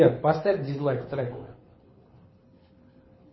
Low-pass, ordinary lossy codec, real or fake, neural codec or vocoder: 7.2 kHz; MP3, 24 kbps; fake; codec, 24 kHz, 6 kbps, HILCodec